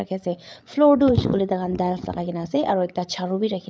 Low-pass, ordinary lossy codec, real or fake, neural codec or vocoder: none; none; fake; codec, 16 kHz, 8 kbps, FreqCodec, larger model